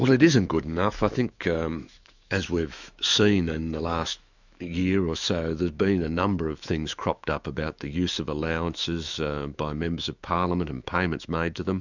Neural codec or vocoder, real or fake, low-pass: autoencoder, 48 kHz, 128 numbers a frame, DAC-VAE, trained on Japanese speech; fake; 7.2 kHz